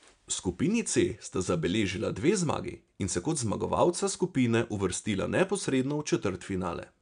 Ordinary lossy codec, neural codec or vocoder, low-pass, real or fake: none; none; 9.9 kHz; real